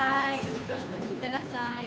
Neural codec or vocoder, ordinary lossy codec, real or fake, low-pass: codec, 16 kHz, 2 kbps, FunCodec, trained on Chinese and English, 25 frames a second; none; fake; none